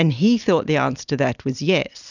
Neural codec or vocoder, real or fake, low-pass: none; real; 7.2 kHz